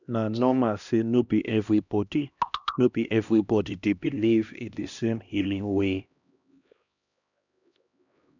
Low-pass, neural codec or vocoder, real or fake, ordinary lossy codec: 7.2 kHz; codec, 16 kHz, 1 kbps, X-Codec, HuBERT features, trained on LibriSpeech; fake; none